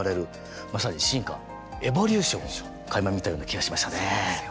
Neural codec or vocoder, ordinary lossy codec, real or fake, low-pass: none; none; real; none